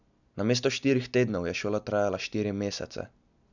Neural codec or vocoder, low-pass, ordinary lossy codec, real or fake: none; 7.2 kHz; none; real